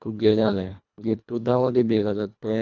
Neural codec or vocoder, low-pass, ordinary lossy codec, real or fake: codec, 24 kHz, 1.5 kbps, HILCodec; 7.2 kHz; none; fake